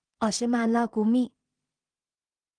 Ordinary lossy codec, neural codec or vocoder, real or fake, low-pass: Opus, 24 kbps; codec, 16 kHz in and 24 kHz out, 0.4 kbps, LongCat-Audio-Codec, two codebook decoder; fake; 9.9 kHz